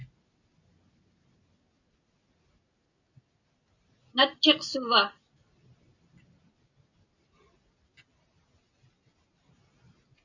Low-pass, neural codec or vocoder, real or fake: 7.2 kHz; none; real